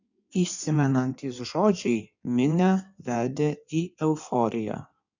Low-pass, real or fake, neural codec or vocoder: 7.2 kHz; fake; codec, 16 kHz in and 24 kHz out, 1.1 kbps, FireRedTTS-2 codec